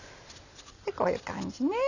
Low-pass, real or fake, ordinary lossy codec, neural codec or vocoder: 7.2 kHz; real; none; none